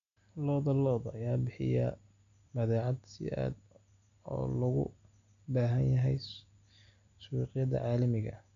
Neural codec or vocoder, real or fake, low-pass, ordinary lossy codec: none; real; 7.2 kHz; none